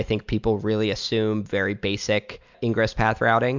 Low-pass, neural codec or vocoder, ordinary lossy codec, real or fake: 7.2 kHz; none; MP3, 64 kbps; real